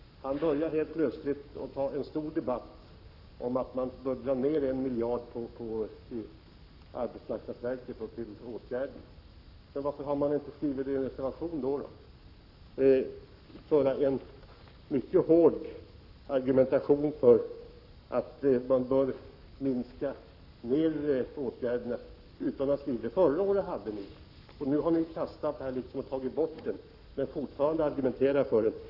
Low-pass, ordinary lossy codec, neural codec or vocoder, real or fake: 5.4 kHz; none; codec, 44.1 kHz, 7.8 kbps, Pupu-Codec; fake